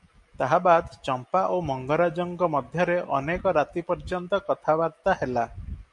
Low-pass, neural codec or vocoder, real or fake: 10.8 kHz; none; real